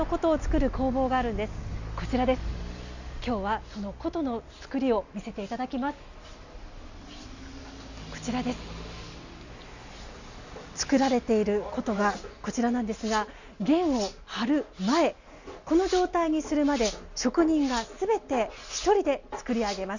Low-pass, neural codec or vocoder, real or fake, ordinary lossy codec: 7.2 kHz; none; real; none